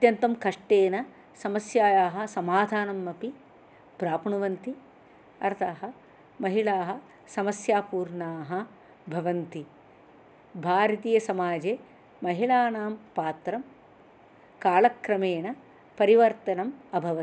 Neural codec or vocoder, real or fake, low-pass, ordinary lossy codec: none; real; none; none